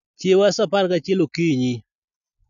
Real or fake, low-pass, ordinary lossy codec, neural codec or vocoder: real; 7.2 kHz; none; none